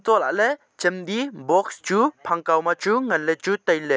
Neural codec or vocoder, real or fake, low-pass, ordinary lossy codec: none; real; none; none